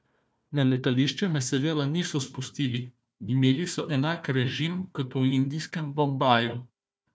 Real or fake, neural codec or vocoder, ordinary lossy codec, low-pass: fake; codec, 16 kHz, 1 kbps, FunCodec, trained on Chinese and English, 50 frames a second; none; none